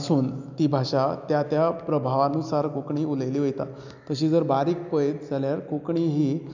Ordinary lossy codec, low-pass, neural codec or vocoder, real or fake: none; 7.2 kHz; none; real